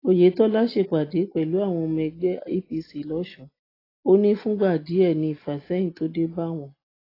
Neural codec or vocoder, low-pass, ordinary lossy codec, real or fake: none; 5.4 kHz; AAC, 24 kbps; real